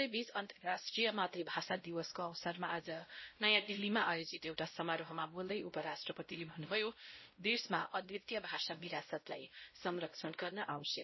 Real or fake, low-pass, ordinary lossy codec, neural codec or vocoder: fake; 7.2 kHz; MP3, 24 kbps; codec, 16 kHz, 0.5 kbps, X-Codec, WavLM features, trained on Multilingual LibriSpeech